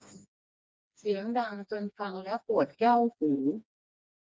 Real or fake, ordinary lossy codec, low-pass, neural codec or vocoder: fake; none; none; codec, 16 kHz, 2 kbps, FreqCodec, smaller model